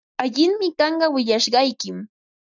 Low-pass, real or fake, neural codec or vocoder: 7.2 kHz; real; none